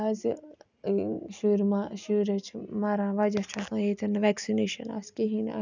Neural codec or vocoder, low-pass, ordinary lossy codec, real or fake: none; 7.2 kHz; none; real